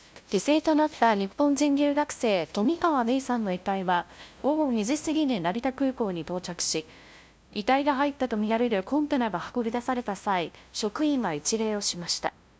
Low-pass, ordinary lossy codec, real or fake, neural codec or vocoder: none; none; fake; codec, 16 kHz, 0.5 kbps, FunCodec, trained on LibriTTS, 25 frames a second